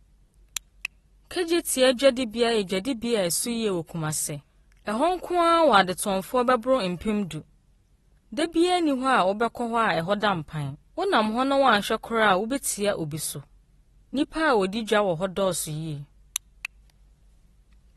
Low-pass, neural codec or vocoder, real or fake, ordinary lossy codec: 14.4 kHz; none; real; AAC, 32 kbps